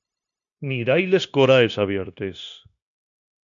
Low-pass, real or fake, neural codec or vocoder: 7.2 kHz; fake; codec, 16 kHz, 0.9 kbps, LongCat-Audio-Codec